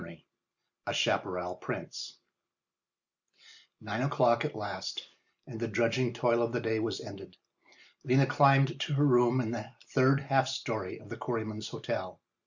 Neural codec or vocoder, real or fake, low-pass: none; real; 7.2 kHz